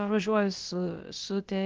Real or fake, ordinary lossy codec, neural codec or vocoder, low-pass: fake; Opus, 16 kbps; codec, 16 kHz, about 1 kbps, DyCAST, with the encoder's durations; 7.2 kHz